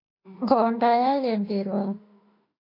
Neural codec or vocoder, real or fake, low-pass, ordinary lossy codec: autoencoder, 48 kHz, 32 numbers a frame, DAC-VAE, trained on Japanese speech; fake; 5.4 kHz; AAC, 32 kbps